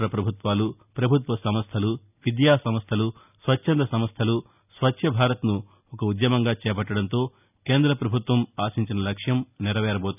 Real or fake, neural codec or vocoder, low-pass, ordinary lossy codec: real; none; 3.6 kHz; none